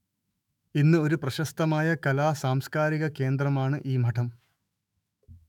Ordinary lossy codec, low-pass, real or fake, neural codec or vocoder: none; 19.8 kHz; fake; autoencoder, 48 kHz, 128 numbers a frame, DAC-VAE, trained on Japanese speech